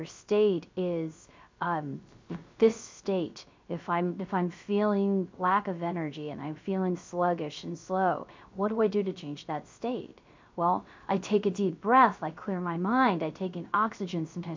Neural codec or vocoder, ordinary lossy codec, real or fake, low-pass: codec, 16 kHz, 0.3 kbps, FocalCodec; MP3, 64 kbps; fake; 7.2 kHz